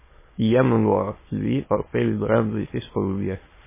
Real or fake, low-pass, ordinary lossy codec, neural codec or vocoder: fake; 3.6 kHz; MP3, 16 kbps; autoencoder, 22.05 kHz, a latent of 192 numbers a frame, VITS, trained on many speakers